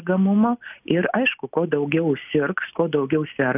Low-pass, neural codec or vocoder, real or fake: 3.6 kHz; none; real